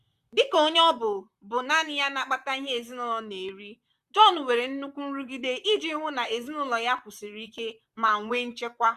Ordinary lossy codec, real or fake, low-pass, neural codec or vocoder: Opus, 64 kbps; fake; 14.4 kHz; vocoder, 44.1 kHz, 128 mel bands, Pupu-Vocoder